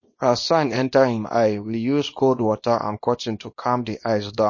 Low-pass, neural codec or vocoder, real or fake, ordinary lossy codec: 7.2 kHz; codec, 24 kHz, 0.9 kbps, WavTokenizer, small release; fake; MP3, 32 kbps